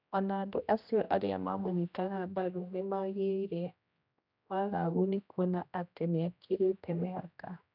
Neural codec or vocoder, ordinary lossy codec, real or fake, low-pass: codec, 16 kHz, 1 kbps, X-Codec, HuBERT features, trained on general audio; none; fake; 5.4 kHz